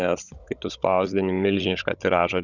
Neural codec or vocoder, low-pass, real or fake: codec, 16 kHz, 16 kbps, FunCodec, trained on Chinese and English, 50 frames a second; 7.2 kHz; fake